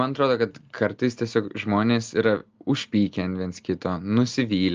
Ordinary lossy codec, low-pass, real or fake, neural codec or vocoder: Opus, 24 kbps; 7.2 kHz; real; none